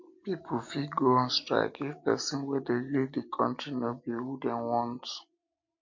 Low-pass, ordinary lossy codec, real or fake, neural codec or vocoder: 7.2 kHz; MP3, 48 kbps; real; none